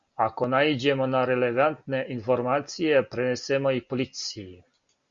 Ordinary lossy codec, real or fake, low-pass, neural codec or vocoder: Opus, 64 kbps; real; 7.2 kHz; none